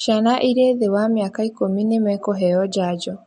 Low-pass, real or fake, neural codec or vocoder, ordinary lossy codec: 19.8 kHz; real; none; MP3, 48 kbps